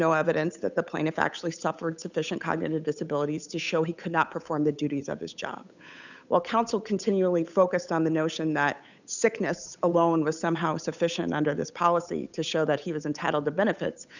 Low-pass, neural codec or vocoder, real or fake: 7.2 kHz; codec, 16 kHz, 8 kbps, FunCodec, trained on Chinese and English, 25 frames a second; fake